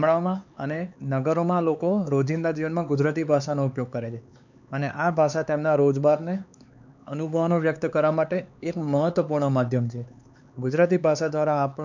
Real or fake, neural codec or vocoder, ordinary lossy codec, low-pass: fake; codec, 16 kHz, 4 kbps, X-Codec, HuBERT features, trained on LibriSpeech; none; 7.2 kHz